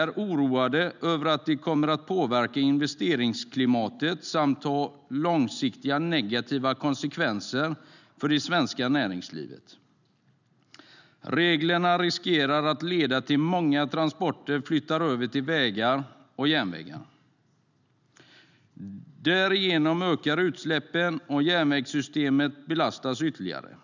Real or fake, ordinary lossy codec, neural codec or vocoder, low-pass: real; none; none; 7.2 kHz